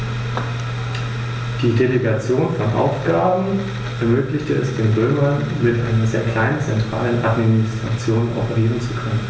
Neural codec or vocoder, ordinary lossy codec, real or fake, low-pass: none; none; real; none